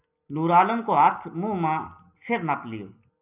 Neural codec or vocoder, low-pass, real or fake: none; 3.6 kHz; real